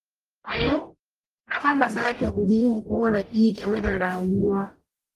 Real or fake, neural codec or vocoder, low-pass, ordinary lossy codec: fake; codec, 44.1 kHz, 0.9 kbps, DAC; 14.4 kHz; Opus, 24 kbps